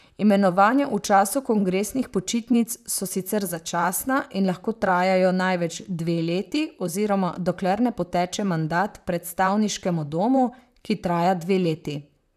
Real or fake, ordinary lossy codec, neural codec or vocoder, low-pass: fake; none; vocoder, 44.1 kHz, 128 mel bands, Pupu-Vocoder; 14.4 kHz